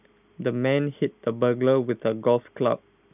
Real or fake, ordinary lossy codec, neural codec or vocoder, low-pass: real; none; none; 3.6 kHz